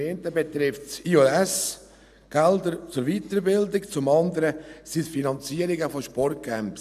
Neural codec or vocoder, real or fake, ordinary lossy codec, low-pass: none; real; none; 14.4 kHz